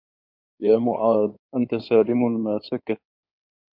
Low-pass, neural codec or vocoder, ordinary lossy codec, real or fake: 5.4 kHz; codec, 16 kHz in and 24 kHz out, 2.2 kbps, FireRedTTS-2 codec; MP3, 48 kbps; fake